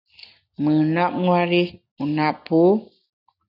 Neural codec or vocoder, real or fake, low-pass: none; real; 5.4 kHz